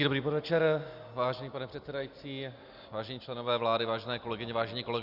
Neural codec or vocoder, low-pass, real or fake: none; 5.4 kHz; real